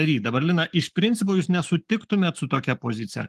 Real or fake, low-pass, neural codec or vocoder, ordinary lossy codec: fake; 14.4 kHz; codec, 44.1 kHz, 7.8 kbps, Pupu-Codec; Opus, 24 kbps